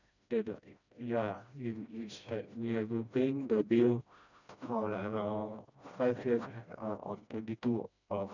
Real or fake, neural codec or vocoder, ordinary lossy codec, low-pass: fake; codec, 16 kHz, 1 kbps, FreqCodec, smaller model; none; 7.2 kHz